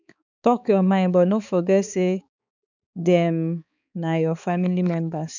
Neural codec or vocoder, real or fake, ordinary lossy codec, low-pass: codec, 16 kHz, 4 kbps, X-Codec, HuBERT features, trained on balanced general audio; fake; none; 7.2 kHz